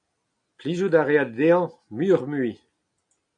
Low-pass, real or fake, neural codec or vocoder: 9.9 kHz; real; none